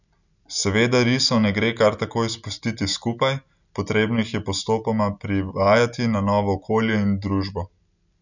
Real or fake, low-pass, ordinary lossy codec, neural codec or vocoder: real; 7.2 kHz; none; none